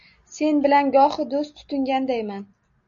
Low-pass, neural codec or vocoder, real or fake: 7.2 kHz; none; real